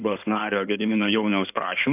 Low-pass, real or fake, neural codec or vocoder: 3.6 kHz; fake; codec, 16 kHz in and 24 kHz out, 2.2 kbps, FireRedTTS-2 codec